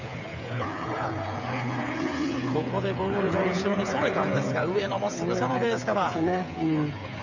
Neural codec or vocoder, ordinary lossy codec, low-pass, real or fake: codec, 16 kHz, 8 kbps, FreqCodec, smaller model; none; 7.2 kHz; fake